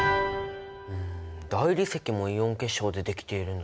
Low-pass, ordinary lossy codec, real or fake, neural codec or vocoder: none; none; real; none